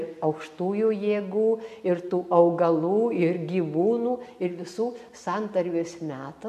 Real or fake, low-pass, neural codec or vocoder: real; 14.4 kHz; none